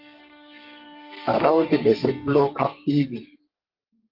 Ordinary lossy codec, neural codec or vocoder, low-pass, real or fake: Opus, 32 kbps; codec, 32 kHz, 1.9 kbps, SNAC; 5.4 kHz; fake